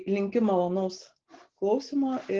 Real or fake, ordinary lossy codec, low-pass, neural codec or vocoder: real; Opus, 16 kbps; 7.2 kHz; none